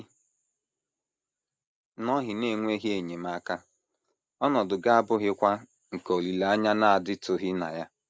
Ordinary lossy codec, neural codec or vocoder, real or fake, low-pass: none; none; real; none